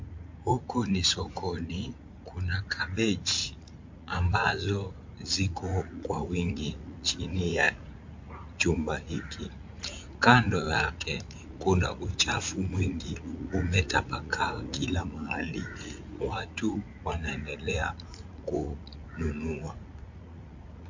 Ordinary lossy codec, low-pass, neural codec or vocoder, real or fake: MP3, 48 kbps; 7.2 kHz; vocoder, 44.1 kHz, 80 mel bands, Vocos; fake